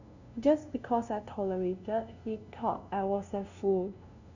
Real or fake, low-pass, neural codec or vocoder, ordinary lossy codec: fake; 7.2 kHz; codec, 16 kHz, 0.5 kbps, FunCodec, trained on LibriTTS, 25 frames a second; none